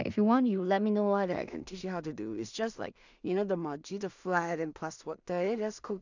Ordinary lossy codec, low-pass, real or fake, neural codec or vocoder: none; 7.2 kHz; fake; codec, 16 kHz in and 24 kHz out, 0.4 kbps, LongCat-Audio-Codec, two codebook decoder